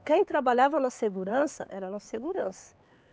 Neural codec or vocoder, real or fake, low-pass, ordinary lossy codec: codec, 16 kHz, 4 kbps, X-Codec, HuBERT features, trained on LibriSpeech; fake; none; none